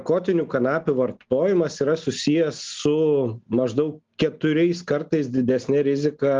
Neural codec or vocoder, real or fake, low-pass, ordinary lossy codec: none; real; 7.2 kHz; Opus, 32 kbps